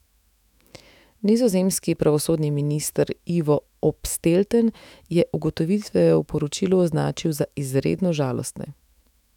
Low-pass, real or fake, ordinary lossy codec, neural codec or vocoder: 19.8 kHz; fake; none; autoencoder, 48 kHz, 128 numbers a frame, DAC-VAE, trained on Japanese speech